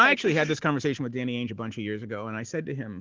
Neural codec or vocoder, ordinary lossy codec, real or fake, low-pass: none; Opus, 16 kbps; real; 7.2 kHz